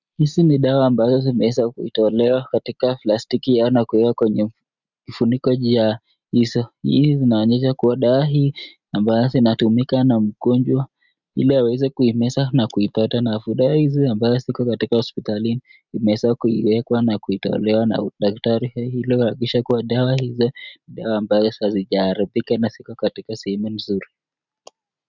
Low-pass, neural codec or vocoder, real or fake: 7.2 kHz; none; real